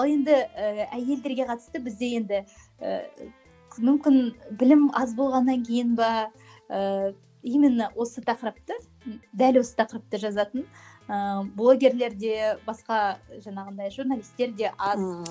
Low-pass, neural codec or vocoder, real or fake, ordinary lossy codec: none; none; real; none